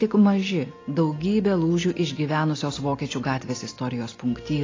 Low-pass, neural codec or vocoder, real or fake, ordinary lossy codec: 7.2 kHz; none; real; AAC, 32 kbps